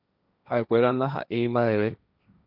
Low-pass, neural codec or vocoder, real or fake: 5.4 kHz; codec, 16 kHz, 1.1 kbps, Voila-Tokenizer; fake